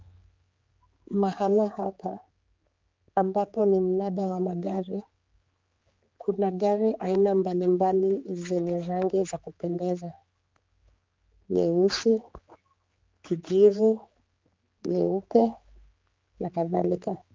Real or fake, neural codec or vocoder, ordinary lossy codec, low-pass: fake; codec, 16 kHz, 4 kbps, X-Codec, HuBERT features, trained on general audio; Opus, 24 kbps; 7.2 kHz